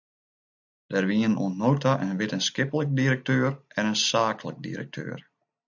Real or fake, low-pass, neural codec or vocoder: real; 7.2 kHz; none